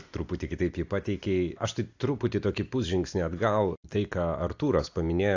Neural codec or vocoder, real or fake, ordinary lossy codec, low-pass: none; real; AAC, 48 kbps; 7.2 kHz